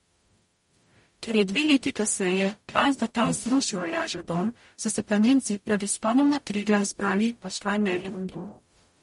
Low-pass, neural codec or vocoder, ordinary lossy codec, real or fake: 19.8 kHz; codec, 44.1 kHz, 0.9 kbps, DAC; MP3, 48 kbps; fake